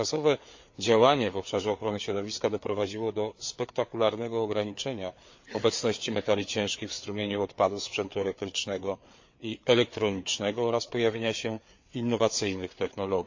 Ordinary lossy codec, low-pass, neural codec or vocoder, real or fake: MP3, 48 kbps; 7.2 kHz; codec, 16 kHz, 4 kbps, FreqCodec, larger model; fake